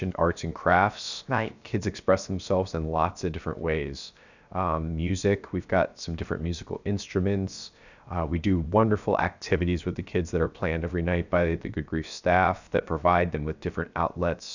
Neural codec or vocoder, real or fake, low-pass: codec, 16 kHz, 0.7 kbps, FocalCodec; fake; 7.2 kHz